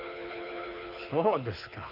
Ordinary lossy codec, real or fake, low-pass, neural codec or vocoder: none; fake; 5.4 kHz; codec, 24 kHz, 6 kbps, HILCodec